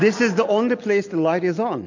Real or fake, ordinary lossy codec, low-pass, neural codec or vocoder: real; AAC, 48 kbps; 7.2 kHz; none